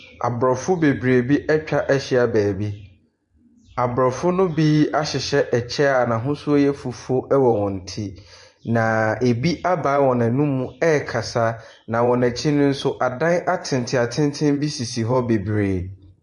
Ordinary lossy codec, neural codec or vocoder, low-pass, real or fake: MP3, 48 kbps; vocoder, 24 kHz, 100 mel bands, Vocos; 10.8 kHz; fake